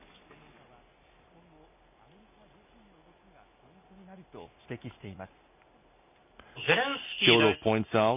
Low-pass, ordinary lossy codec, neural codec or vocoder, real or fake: 3.6 kHz; none; none; real